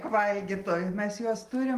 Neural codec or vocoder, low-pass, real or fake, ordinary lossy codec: none; 14.4 kHz; real; Opus, 24 kbps